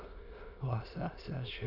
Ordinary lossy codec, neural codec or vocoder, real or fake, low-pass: none; autoencoder, 22.05 kHz, a latent of 192 numbers a frame, VITS, trained on many speakers; fake; 5.4 kHz